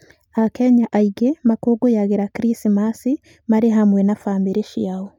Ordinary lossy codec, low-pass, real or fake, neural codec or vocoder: none; 19.8 kHz; real; none